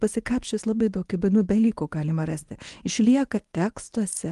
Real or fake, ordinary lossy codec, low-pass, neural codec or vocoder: fake; Opus, 24 kbps; 10.8 kHz; codec, 24 kHz, 0.9 kbps, WavTokenizer, medium speech release version 1